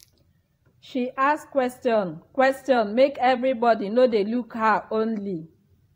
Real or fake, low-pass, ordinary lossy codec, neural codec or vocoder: fake; 19.8 kHz; AAC, 48 kbps; vocoder, 44.1 kHz, 128 mel bands every 512 samples, BigVGAN v2